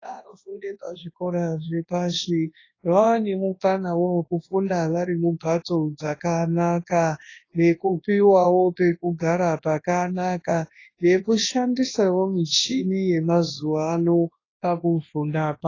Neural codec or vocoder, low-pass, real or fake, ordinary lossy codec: codec, 24 kHz, 0.9 kbps, WavTokenizer, large speech release; 7.2 kHz; fake; AAC, 32 kbps